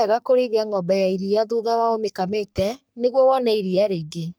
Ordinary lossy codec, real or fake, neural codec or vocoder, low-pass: none; fake; codec, 44.1 kHz, 3.4 kbps, Pupu-Codec; none